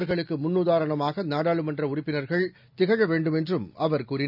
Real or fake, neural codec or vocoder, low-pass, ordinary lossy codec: real; none; 5.4 kHz; none